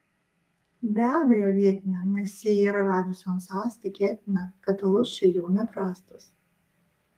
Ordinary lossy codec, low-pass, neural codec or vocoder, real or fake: Opus, 32 kbps; 14.4 kHz; codec, 32 kHz, 1.9 kbps, SNAC; fake